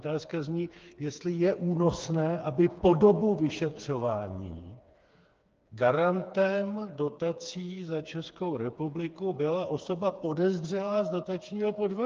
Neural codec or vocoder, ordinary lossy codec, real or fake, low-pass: codec, 16 kHz, 4 kbps, FreqCodec, smaller model; Opus, 24 kbps; fake; 7.2 kHz